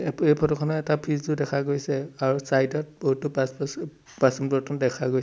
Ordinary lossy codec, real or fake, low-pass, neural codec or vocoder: none; real; none; none